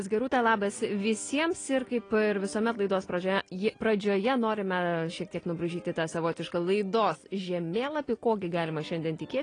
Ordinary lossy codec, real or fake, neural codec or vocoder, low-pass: AAC, 32 kbps; real; none; 9.9 kHz